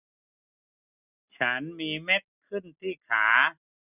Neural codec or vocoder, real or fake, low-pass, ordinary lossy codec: none; real; 3.6 kHz; none